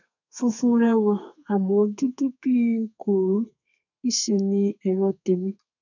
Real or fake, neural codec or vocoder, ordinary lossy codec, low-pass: fake; codec, 32 kHz, 1.9 kbps, SNAC; none; 7.2 kHz